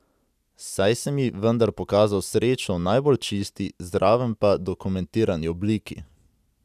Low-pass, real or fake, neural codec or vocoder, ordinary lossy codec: 14.4 kHz; real; none; none